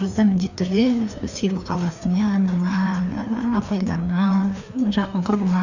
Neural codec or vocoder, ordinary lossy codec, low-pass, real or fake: codec, 16 kHz, 2 kbps, FreqCodec, larger model; none; 7.2 kHz; fake